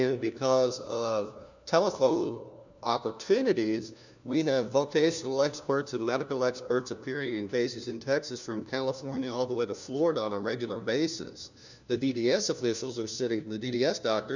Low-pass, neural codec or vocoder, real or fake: 7.2 kHz; codec, 16 kHz, 1 kbps, FunCodec, trained on LibriTTS, 50 frames a second; fake